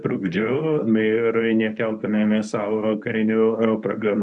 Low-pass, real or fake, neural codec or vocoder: 10.8 kHz; fake; codec, 24 kHz, 0.9 kbps, WavTokenizer, medium speech release version 1